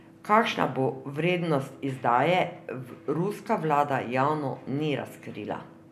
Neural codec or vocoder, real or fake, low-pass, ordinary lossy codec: none; real; 14.4 kHz; none